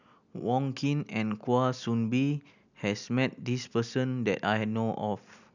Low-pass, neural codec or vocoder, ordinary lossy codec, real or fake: 7.2 kHz; none; none; real